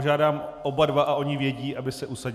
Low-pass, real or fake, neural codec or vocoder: 14.4 kHz; real; none